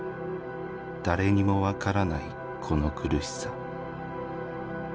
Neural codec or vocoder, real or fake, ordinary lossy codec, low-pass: none; real; none; none